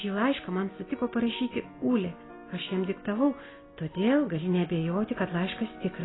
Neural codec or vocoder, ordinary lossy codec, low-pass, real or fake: none; AAC, 16 kbps; 7.2 kHz; real